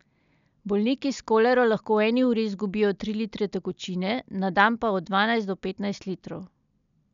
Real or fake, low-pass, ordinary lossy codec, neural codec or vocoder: real; 7.2 kHz; MP3, 96 kbps; none